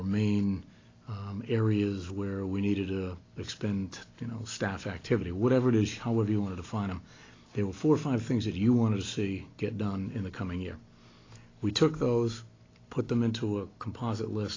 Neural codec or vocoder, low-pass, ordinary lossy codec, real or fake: none; 7.2 kHz; AAC, 32 kbps; real